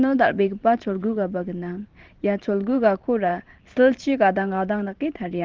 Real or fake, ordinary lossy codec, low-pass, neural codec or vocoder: fake; Opus, 16 kbps; 7.2 kHz; vocoder, 44.1 kHz, 128 mel bands every 512 samples, BigVGAN v2